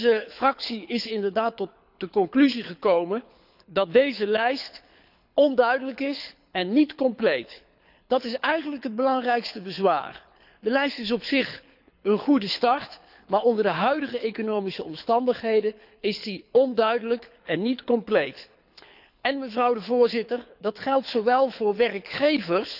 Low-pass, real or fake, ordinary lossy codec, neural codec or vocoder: 5.4 kHz; fake; none; codec, 24 kHz, 6 kbps, HILCodec